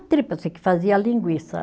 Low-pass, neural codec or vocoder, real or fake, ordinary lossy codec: none; none; real; none